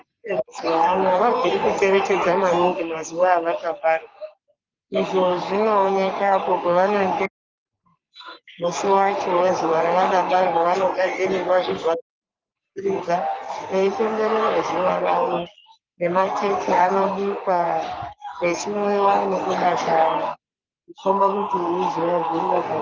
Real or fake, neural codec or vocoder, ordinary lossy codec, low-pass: fake; codec, 44.1 kHz, 3.4 kbps, Pupu-Codec; Opus, 32 kbps; 7.2 kHz